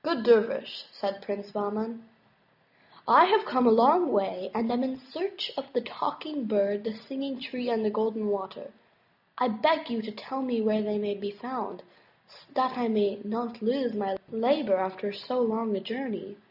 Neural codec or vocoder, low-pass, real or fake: none; 5.4 kHz; real